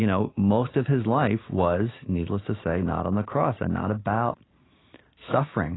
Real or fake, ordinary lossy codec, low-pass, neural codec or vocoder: real; AAC, 16 kbps; 7.2 kHz; none